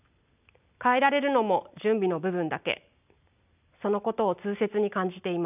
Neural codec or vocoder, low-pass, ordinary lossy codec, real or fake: none; 3.6 kHz; none; real